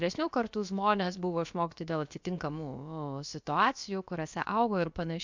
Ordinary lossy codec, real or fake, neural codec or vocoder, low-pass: MP3, 64 kbps; fake; codec, 16 kHz, about 1 kbps, DyCAST, with the encoder's durations; 7.2 kHz